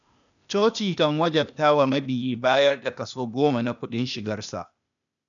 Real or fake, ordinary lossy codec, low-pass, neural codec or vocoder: fake; none; 7.2 kHz; codec, 16 kHz, 0.8 kbps, ZipCodec